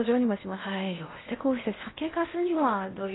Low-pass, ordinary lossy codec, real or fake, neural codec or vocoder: 7.2 kHz; AAC, 16 kbps; fake; codec, 16 kHz in and 24 kHz out, 0.6 kbps, FocalCodec, streaming, 2048 codes